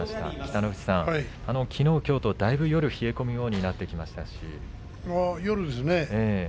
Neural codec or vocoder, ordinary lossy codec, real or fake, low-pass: none; none; real; none